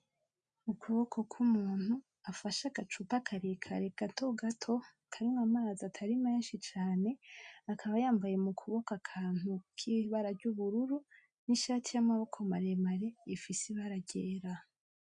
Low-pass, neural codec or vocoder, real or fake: 9.9 kHz; none; real